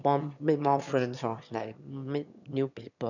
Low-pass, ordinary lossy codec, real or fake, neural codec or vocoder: 7.2 kHz; none; fake; autoencoder, 22.05 kHz, a latent of 192 numbers a frame, VITS, trained on one speaker